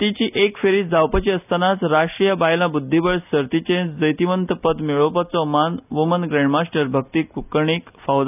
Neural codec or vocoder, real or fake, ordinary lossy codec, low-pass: none; real; none; 3.6 kHz